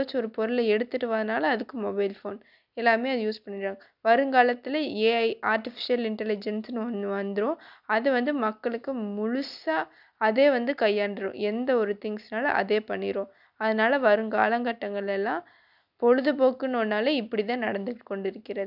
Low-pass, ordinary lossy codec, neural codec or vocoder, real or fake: 5.4 kHz; none; none; real